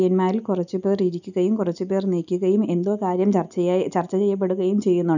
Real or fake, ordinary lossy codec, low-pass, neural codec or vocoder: real; none; 7.2 kHz; none